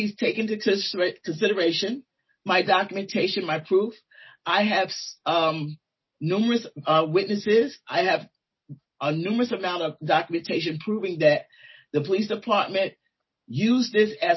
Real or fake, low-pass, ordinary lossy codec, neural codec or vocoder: real; 7.2 kHz; MP3, 24 kbps; none